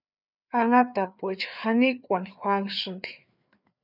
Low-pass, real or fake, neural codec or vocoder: 5.4 kHz; fake; codec, 16 kHz, 4 kbps, FreqCodec, larger model